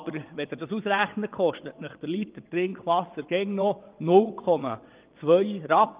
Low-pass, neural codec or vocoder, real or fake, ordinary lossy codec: 3.6 kHz; vocoder, 22.05 kHz, 80 mel bands, WaveNeXt; fake; Opus, 32 kbps